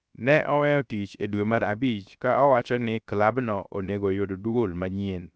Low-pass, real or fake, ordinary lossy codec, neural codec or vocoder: none; fake; none; codec, 16 kHz, 0.7 kbps, FocalCodec